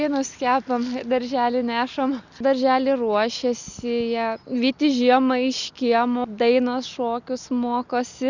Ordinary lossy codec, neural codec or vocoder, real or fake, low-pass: Opus, 64 kbps; none; real; 7.2 kHz